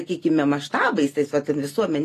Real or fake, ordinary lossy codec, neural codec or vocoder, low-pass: real; AAC, 48 kbps; none; 14.4 kHz